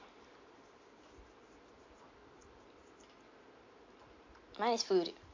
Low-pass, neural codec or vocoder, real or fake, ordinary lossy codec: 7.2 kHz; none; real; MP3, 48 kbps